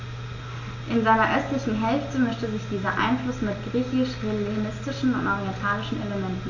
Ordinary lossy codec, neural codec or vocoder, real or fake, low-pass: AAC, 48 kbps; none; real; 7.2 kHz